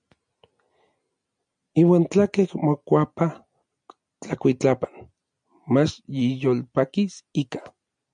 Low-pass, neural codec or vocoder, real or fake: 9.9 kHz; none; real